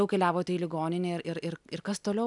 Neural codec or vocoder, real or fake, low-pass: none; real; 10.8 kHz